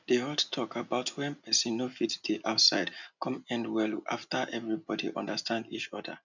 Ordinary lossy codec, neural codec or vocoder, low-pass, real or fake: none; none; 7.2 kHz; real